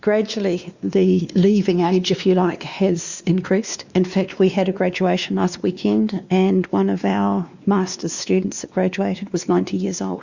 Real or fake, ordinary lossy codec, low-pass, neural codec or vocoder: fake; Opus, 64 kbps; 7.2 kHz; codec, 16 kHz, 2 kbps, X-Codec, WavLM features, trained on Multilingual LibriSpeech